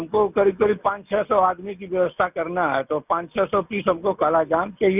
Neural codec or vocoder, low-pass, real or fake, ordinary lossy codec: none; 3.6 kHz; real; none